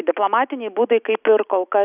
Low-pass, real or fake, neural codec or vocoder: 3.6 kHz; real; none